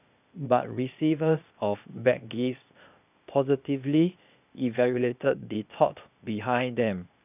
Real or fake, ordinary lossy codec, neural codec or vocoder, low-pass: fake; none; codec, 16 kHz, 0.8 kbps, ZipCodec; 3.6 kHz